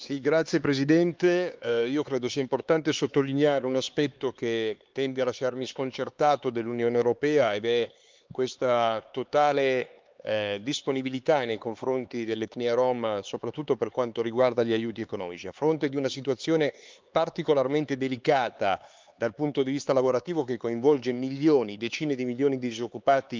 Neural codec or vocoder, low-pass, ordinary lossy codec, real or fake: codec, 16 kHz, 4 kbps, X-Codec, HuBERT features, trained on LibriSpeech; 7.2 kHz; Opus, 24 kbps; fake